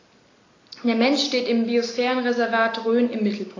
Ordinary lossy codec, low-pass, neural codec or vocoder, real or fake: AAC, 32 kbps; 7.2 kHz; none; real